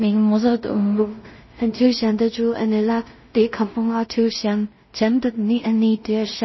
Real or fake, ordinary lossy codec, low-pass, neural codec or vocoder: fake; MP3, 24 kbps; 7.2 kHz; codec, 16 kHz in and 24 kHz out, 0.4 kbps, LongCat-Audio-Codec, two codebook decoder